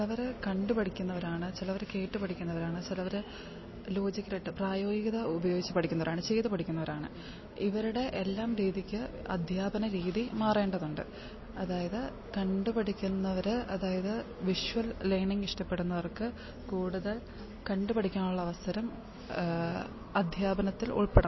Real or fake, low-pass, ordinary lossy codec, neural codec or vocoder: real; 7.2 kHz; MP3, 24 kbps; none